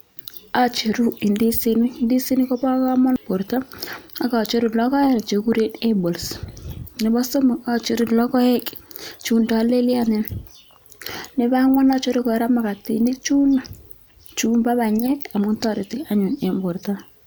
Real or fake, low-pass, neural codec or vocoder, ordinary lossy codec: real; none; none; none